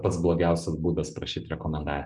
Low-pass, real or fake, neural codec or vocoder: 10.8 kHz; real; none